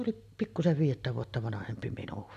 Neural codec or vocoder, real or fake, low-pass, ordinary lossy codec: none; real; 14.4 kHz; none